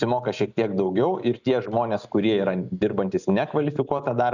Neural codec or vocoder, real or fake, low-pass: none; real; 7.2 kHz